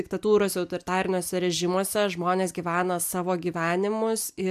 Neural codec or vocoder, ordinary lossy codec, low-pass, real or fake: none; AAC, 96 kbps; 14.4 kHz; real